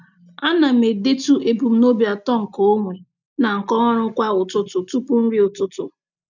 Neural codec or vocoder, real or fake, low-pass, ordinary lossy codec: none; real; 7.2 kHz; none